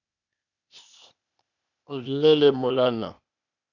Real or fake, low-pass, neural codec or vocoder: fake; 7.2 kHz; codec, 16 kHz, 0.8 kbps, ZipCodec